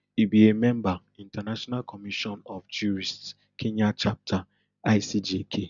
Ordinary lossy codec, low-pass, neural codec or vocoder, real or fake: none; 7.2 kHz; none; real